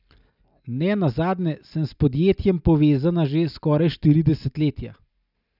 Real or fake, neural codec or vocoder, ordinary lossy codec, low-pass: real; none; none; 5.4 kHz